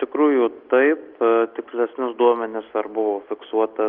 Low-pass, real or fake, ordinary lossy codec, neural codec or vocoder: 5.4 kHz; real; Opus, 32 kbps; none